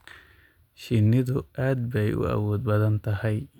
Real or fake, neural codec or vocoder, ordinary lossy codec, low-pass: real; none; none; 19.8 kHz